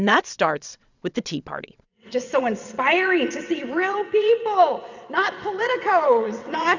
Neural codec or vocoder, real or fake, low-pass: vocoder, 22.05 kHz, 80 mel bands, WaveNeXt; fake; 7.2 kHz